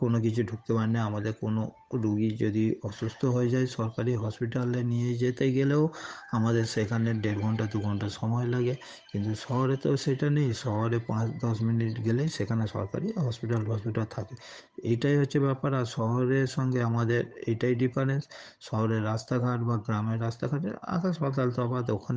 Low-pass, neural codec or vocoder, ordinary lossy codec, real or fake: none; codec, 16 kHz, 8 kbps, FunCodec, trained on Chinese and English, 25 frames a second; none; fake